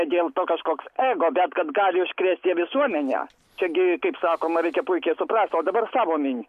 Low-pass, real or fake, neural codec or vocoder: 14.4 kHz; real; none